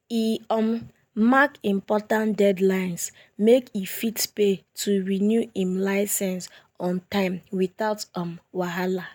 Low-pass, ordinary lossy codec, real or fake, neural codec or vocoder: none; none; real; none